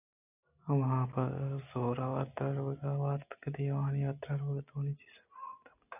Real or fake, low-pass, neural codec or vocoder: real; 3.6 kHz; none